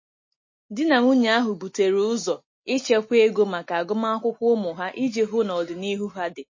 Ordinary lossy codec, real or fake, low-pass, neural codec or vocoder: MP3, 32 kbps; real; 7.2 kHz; none